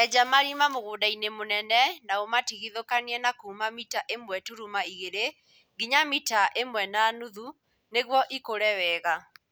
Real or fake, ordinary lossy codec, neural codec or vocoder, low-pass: real; none; none; none